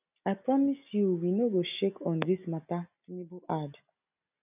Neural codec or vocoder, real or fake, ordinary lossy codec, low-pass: none; real; none; 3.6 kHz